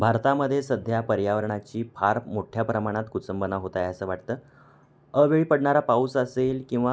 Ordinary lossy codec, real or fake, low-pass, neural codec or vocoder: none; real; none; none